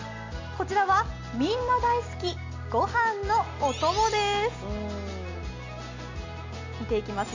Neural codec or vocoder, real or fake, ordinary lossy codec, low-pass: none; real; MP3, 48 kbps; 7.2 kHz